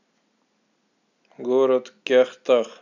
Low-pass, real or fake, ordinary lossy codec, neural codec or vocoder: 7.2 kHz; real; none; none